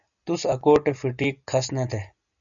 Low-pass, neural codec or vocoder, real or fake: 7.2 kHz; none; real